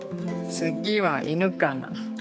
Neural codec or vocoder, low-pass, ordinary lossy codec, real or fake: codec, 16 kHz, 4 kbps, X-Codec, HuBERT features, trained on general audio; none; none; fake